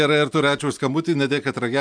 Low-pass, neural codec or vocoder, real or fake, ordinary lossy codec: 9.9 kHz; none; real; MP3, 96 kbps